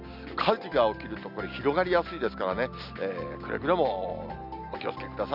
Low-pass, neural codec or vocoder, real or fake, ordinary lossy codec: 5.4 kHz; none; real; none